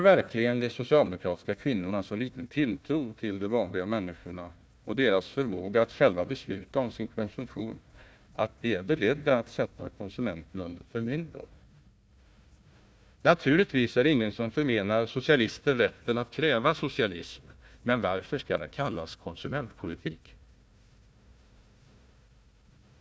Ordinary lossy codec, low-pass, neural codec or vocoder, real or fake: none; none; codec, 16 kHz, 1 kbps, FunCodec, trained on Chinese and English, 50 frames a second; fake